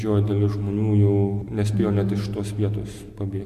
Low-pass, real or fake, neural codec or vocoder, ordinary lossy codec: 14.4 kHz; real; none; MP3, 64 kbps